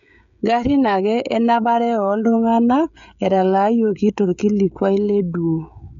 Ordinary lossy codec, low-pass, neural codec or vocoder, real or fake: none; 7.2 kHz; codec, 16 kHz, 16 kbps, FreqCodec, smaller model; fake